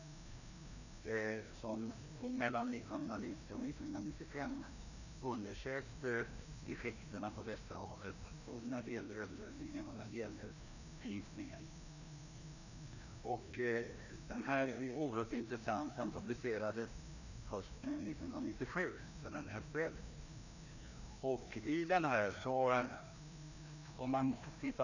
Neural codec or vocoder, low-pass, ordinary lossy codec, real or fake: codec, 16 kHz, 1 kbps, FreqCodec, larger model; 7.2 kHz; none; fake